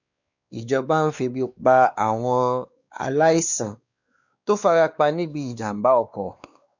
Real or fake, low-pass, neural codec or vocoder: fake; 7.2 kHz; codec, 16 kHz, 2 kbps, X-Codec, WavLM features, trained on Multilingual LibriSpeech